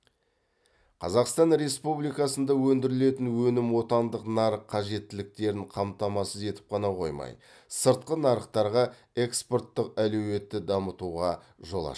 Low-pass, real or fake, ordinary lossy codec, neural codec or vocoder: none; real; none; none